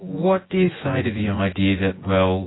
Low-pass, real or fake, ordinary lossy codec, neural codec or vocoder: 7.2 kHz; fake; AAC, 16 kbps; vocoder, 24 kHz, 100 mel bands, Vocos